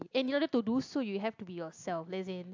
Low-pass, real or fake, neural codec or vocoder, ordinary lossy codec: 7.2 kHz; real; none; AAC, 48 kbps